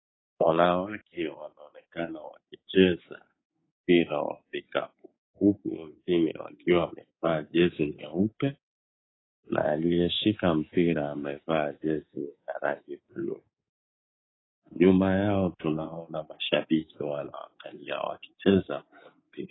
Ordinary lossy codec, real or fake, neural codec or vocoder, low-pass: AAC, 16 kbps; fake; codec, 24 kHz, 3.1 kbps, DualCodec; 7.2 kHz